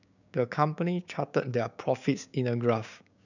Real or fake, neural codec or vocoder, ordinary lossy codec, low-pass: fake; codec, 16 kHz, 6 kbps, DAC; none; 7.2 kHz